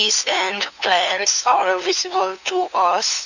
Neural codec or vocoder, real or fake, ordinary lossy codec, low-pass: codec, 16 kHz, 2 kbps, FunCodec, trained on LibriTTS, 25 frames a second; fake; none; 7.2 kHz